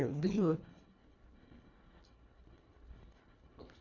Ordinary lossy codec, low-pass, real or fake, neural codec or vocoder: none; 7.2 kHz; fake; codec, 24 kHz, 1.5 kbps, HILCodec